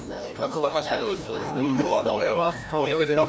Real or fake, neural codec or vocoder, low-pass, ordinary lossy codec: fake; codec, 16 kHz, 1 kbps, FreqCodec, larger model; none; none